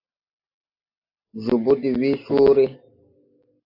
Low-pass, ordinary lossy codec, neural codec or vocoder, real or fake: 5.4 kHz; AAC, 32 kbps; none; real